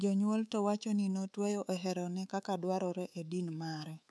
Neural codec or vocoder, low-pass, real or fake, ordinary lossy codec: codec, 24 kHz, 3.1 kbps, DualCodec; none; fake; none